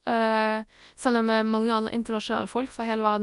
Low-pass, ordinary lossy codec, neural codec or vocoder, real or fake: 10.8 kHz; none; codec, 24 kHz, 0.9 kbps, WavTokenizer, large speech release; fake